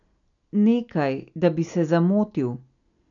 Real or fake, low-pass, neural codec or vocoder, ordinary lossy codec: real; 7.2 kHz; none; none